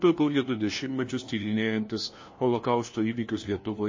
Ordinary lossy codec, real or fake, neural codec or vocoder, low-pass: MP3, 32 kbps; fake; codec, 16 kHz, 1 kbps, FunCodec, trained on LibriTTS, 50 frames a second; 7.2 kHz